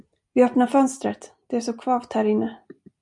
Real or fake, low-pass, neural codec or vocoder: real; 10.8 kHz; none